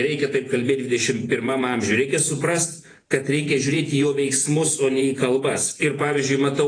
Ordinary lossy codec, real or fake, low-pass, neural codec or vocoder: AAC, 32 kbps; fake; 9.9 kHz; vocoder, 48 kHz, 128 mel bands, Vocos